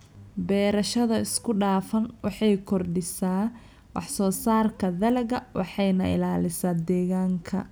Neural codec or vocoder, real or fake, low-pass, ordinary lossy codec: none; real; none; none